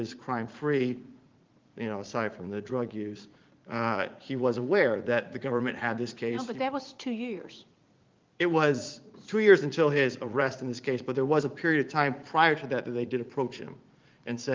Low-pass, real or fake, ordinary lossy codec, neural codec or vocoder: 7.2 kHz; fake; Opus, 32 kbps; vocoder, 44.1 kHz, 80 mel bands, Vocos